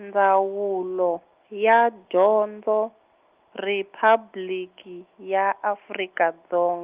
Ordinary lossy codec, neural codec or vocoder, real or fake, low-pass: Opus, 24 kbps; none; real; 3.6 kHz